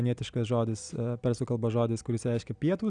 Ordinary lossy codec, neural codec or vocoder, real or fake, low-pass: Opus, 64 kbps; none; real; 9.9 kHz